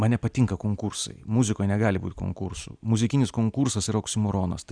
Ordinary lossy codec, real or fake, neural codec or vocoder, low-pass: Opus, 64 kbps; real; none; 9.9 kHz